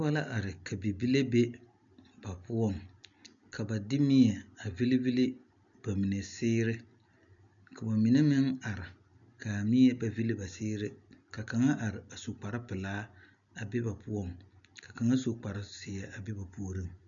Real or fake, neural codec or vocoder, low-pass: real; none; 7.2 kHz